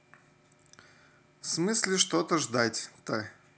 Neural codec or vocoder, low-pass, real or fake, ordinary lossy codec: none; none; real; none